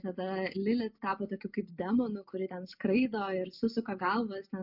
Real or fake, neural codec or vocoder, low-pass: real; none; 5.4 kHz